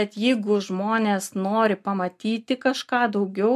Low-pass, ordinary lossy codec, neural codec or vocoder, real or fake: 14.4 kHz; MP3, 96 kbps; none; real